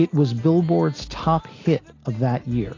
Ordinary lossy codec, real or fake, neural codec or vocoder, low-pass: AAC, 32 kbps; real; none; 7.2 kHz